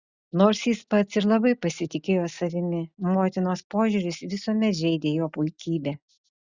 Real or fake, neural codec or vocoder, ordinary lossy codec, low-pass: real; none; Opus, 64 kbps; 7.2 kHz